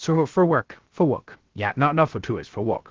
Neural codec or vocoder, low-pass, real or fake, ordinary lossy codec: codec, 16 kHz, 0.3 kbps, FocalCodec; 7.2 kHz; fake; Opus, 16 kbps